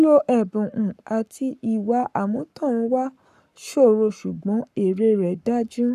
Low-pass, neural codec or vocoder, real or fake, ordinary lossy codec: 14.4 kHz; codec, 44.1 kHz, 7.8 kbps, Pupu-Codec; fake; none